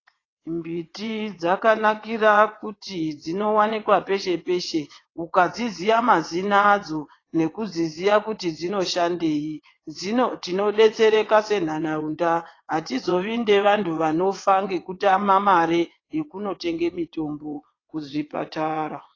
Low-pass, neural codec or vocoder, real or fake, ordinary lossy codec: 7.2 kHz; vocoder, 22.05 kHz, 80 mel bands, WaveNeXt; fake; AAC, 32 kbps